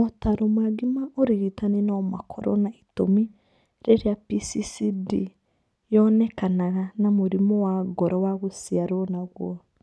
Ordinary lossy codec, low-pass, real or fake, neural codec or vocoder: none; none; real; none